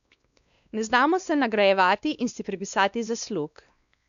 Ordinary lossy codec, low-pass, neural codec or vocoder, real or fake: none; 7.2 kHz; codec, 16 kHz, 2 kbps, X-Codec, WavLM features, trained on Multilingual LibriSpeech; fake